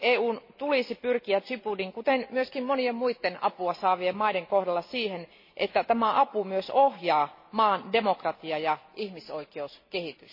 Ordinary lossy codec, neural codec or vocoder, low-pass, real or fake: MP3, 24 kbps; none; 5.4 kHz; real